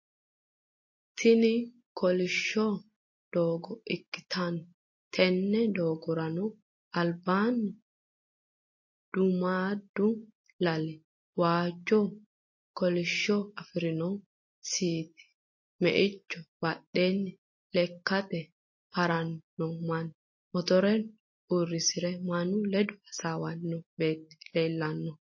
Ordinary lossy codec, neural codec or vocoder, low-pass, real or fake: MP3, 32 kbps; none; 7.2 kHz; real